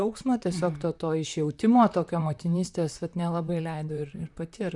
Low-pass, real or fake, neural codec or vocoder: 10.8 kHz; fake; vocoder, 44.1 kHz, 128 mel bands, Pupu-Vocoder